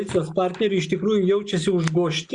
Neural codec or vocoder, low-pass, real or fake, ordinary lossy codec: none; 9.9 kHz; real; Opus, 64 kbps